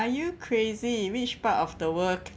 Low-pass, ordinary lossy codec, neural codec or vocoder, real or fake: none; none; none; real